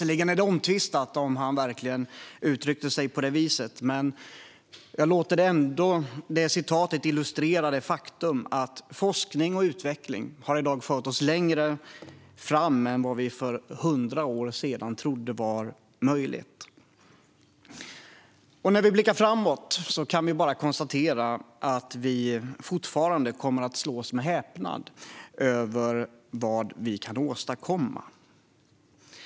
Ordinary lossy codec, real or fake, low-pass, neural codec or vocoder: none; real; none; none